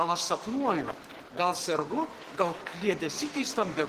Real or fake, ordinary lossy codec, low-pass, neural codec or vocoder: fake; Opus, 16 kbps; 14.4 kHz; codec, 44.1 kHz, 2.6 kbps, SNAC